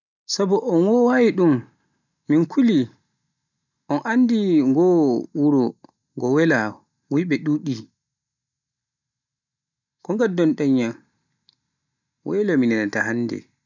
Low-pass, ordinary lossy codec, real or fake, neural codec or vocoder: 7.2 kHz; none; real; none